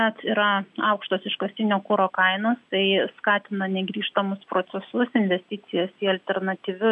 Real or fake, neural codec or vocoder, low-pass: real; none; 10.8 kHz